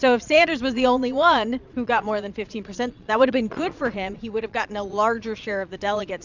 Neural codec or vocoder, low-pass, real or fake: vocoder, 22.05 kHz, 80 mel bands, Vocos; 7.2 kHz; fake